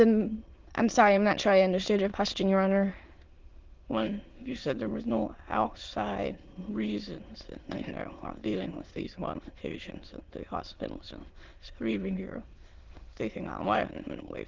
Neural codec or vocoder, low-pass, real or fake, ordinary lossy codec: autoencoder, 22.05 kHz, a latent of 192 numbers a frame, VITS, trained on many speakers; 7.2 kHz; fake; Opus, 16 kbps